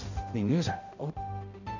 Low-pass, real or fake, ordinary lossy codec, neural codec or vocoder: 7.2 kHz; fake; none; codec, 16 kHz, 0.5 kbps, X-Codec, HuBERT features, trained on balanced general audio